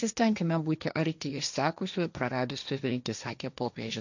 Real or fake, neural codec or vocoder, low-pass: fake; codec, 16 kHz, 1.1 kbps, Voila-Tokenizer; 7.2 kHz